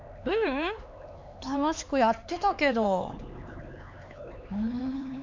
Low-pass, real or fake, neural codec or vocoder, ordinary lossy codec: 7.2 kHz; fake; codec, 16 kHz, 4 kbps, X-Codec, HuBERT features, trained on LibriSpeech; MP3, 64 kbps